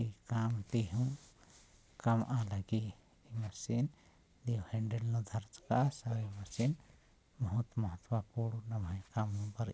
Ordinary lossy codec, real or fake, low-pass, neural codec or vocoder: none; real; none; none